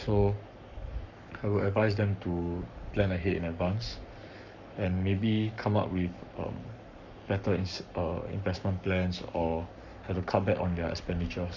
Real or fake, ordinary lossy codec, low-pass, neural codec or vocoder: fake; none; 7.2 kHz; codec, 44.1 kHz, 7.8 kbps, Pupu-Codec